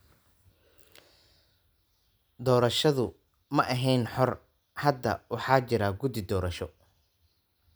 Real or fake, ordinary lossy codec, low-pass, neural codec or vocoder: real; none; none; none